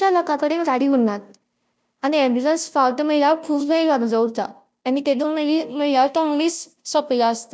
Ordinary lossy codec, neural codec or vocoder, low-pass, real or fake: none; codec, 16 kHz, 0.5 kbps, FunCodec, trained on Chinese and English, 25 frames a second; none; fake